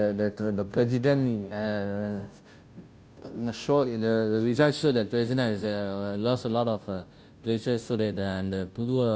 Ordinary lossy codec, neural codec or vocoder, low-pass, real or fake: none; codec, 16 kHz, 0.5 kbps, FunCodec, trained on Chinese and English, 25 frames a second; none; fake